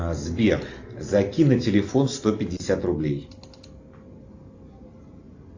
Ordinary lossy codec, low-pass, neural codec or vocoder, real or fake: AAC, 48 kbps; 7.2 kHz; none; real